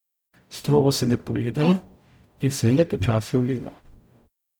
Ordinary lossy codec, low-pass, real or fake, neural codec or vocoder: none; none; fake; codec, 44.1 kHz, 0.9 kbps, DAC